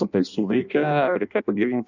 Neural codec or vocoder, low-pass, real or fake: codec, 16 kHz in and 24 kHz out, 0.6 kbps, FireRedTTS-2 codec; 7.2 kHz; fake